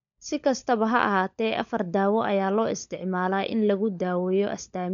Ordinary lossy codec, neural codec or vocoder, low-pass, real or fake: none; codec, 16 kHz, 16 kbps, FunCodec, trained on LibriTTS, 50 frames a second; 7.2 kHz; fake